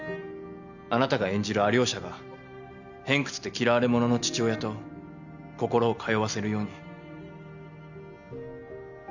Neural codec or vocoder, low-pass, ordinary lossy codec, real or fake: none; 7.2 kHz; none; real